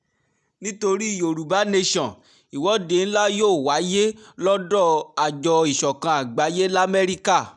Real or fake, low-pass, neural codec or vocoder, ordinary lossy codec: real; 10.8 kHz; none; none